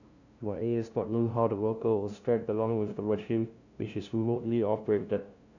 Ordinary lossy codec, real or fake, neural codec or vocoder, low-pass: none; fake; codec, 16 kHz, 0.5 kbps, FunCodec, trained on LibriTTS, 25 frames a second; 7.2 kHz